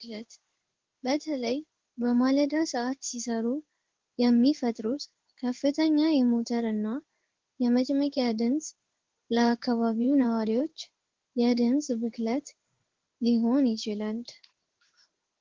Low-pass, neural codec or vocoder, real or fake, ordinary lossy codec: 7.2 kHz; codec, 16 kHz in and 24 kHz out, 1 kbps, XY-Tokenizer; fake; Opus, 16 kbps